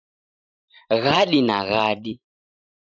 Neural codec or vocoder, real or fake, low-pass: vocoder, 44.1 kHz, 128 mel bands every 512 samples, BigVGAN v2; fake; 7.2 kHz